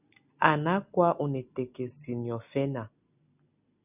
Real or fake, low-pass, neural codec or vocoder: real; 3.6 kHz; none